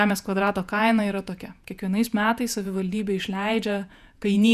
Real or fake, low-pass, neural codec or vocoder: fake; 14.4 kHz; vocoder, 48 kHz, 128 mel bands, Vocos